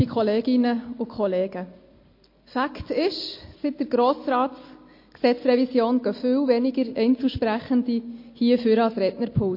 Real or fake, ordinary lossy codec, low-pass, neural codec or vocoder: real; MP3, 32 kbps; 5.4 kHz; none